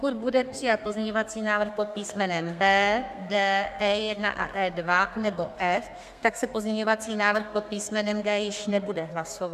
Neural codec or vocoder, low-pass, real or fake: codec, 32 kHz, 1.9 kbps, SNAC; 14.4 kHz; fake